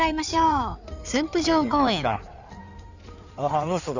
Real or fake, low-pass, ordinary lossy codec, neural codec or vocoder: fake; 7.2 kHz; none; codec, 16 kHz in and 24 kHz out, 2.2 kbps, FireRedTTS-2 codec